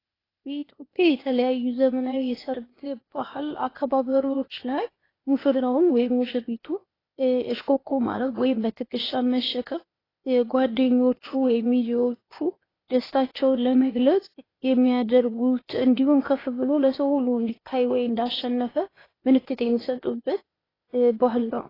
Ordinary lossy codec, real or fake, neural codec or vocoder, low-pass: AAC, 24 kbps; fake; codec, 16 kHz, 0.8 kbps, ZipCodec; 5.4 kHz